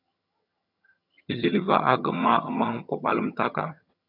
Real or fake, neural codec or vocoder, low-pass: fake; vocoder, 22.05 kHz, 80 mel bands, HiFi-GAN; 5.4 kHz